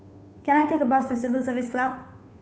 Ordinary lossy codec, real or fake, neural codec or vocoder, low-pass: none; fake; codec, 16 kHz, 2 kbps, FunCodec, trained on Chinese and English, 25 frames a second; none